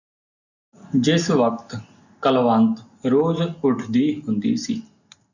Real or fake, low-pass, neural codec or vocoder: real; 7.2 kHz; none